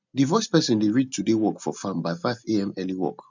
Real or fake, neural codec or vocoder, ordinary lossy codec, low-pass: real; none; none; 7.2 kHz